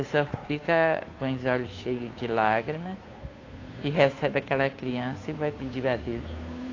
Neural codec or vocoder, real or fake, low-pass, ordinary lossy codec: codec, 16 kHz, 2 kbps, FunCodec, trained on Chinese and English, 25 frames a second; fake; 7.2 kHz; AAC, 32 kbps